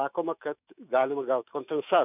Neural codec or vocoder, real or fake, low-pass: autoencoder, 48 kHz, 128 numbers a frame, DAC-VAE, trained on Japanese speech; fake; 3.6 kHz